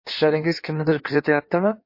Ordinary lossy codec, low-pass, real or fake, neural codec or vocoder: MP3, 32 kbps; 5.4 kHz; fake; codec, 16 kHz in and 24 kHz out, 1.1 kbps, FireRedTTS-2 codec